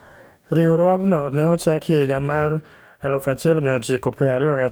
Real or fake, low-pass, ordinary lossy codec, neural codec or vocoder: fake; none; none; codec, 44.1 kHz, 2.6 kbps, DAC